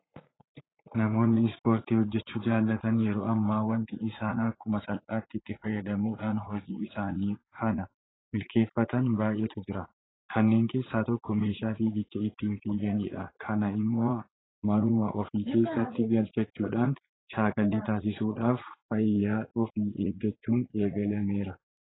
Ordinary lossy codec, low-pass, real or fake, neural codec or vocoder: AAC, 16 kbps; 7.2 kHz; fake; vocoder, 44.1 kHz, 80 mel bands, Vocos